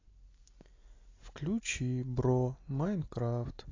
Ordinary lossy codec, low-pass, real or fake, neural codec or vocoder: AAC, 32 kbps; 7.2 kHz; real; none